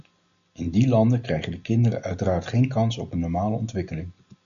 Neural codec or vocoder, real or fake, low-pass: none; real; 7.2 kHz